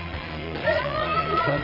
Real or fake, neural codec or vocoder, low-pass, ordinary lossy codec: fake; vocoder, 22.05 kHz, 80 mel bands, Vocos; 5.4 kHz; none